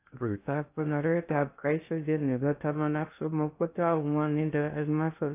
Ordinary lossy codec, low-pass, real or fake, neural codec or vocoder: AAC, 24 kbps; 3.6 kHz; fake; codec, 16 kHz in and 24 kHz out, 0.6 kbps, FocalCodec, streaming, 2048 codes